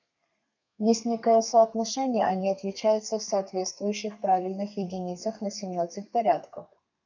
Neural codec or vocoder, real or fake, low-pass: codec, 32 kHz, 1.9 kbps, SNAC; fake; 7.2 kHz